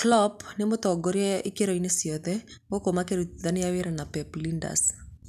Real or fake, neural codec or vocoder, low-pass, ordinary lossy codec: real; none; 14.4 kHz; none